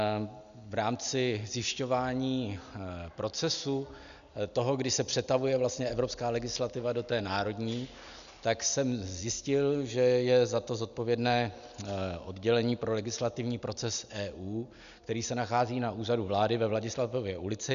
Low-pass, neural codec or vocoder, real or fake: 7.2 kHz; none; real